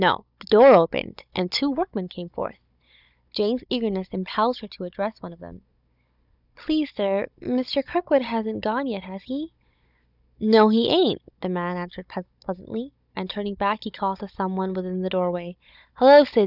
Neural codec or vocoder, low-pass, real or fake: codec, 16 kHz, 16 kbps, FunCodec, trained on Chinese and English, 50 frames a second; 5.4 kHz; fake